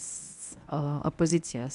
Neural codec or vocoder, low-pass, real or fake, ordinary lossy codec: codec, 24 kHz, 0.9 kbps, WavTokenizer, medium speech release version 1; 10.8 kHz; fake; AAC, 96 kbps